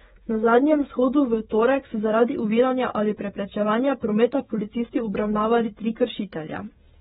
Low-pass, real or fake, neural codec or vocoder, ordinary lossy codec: 7.2 kHz; fake; codec, 16 kHz, 16 kbps, FreqCodec, smaller model; AAC, 16 kbps